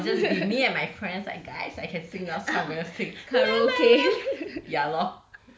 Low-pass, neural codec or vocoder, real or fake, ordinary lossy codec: none; none; real; none